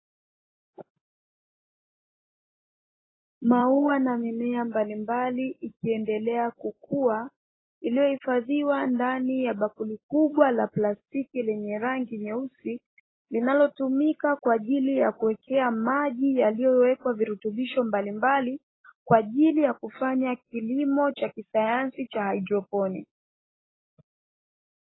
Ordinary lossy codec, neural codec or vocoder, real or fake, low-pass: AAC, 16 kbps; none; real; 7.2 kHz